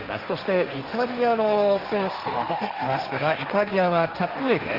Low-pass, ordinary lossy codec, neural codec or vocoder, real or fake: 5.4 kHz; Opus, 32 kbps; codec, 16 kHz, 1.1 kbps, Voila-Tokenizer; fake